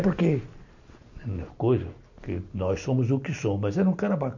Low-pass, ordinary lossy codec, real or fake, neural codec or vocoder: 7.2 kHz; none; real; none